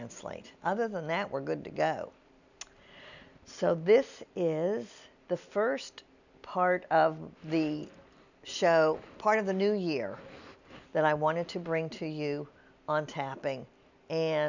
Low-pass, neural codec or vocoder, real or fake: 7.2 kHz; none; real